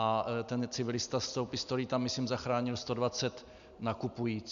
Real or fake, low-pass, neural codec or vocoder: real; 7.2 kHz; none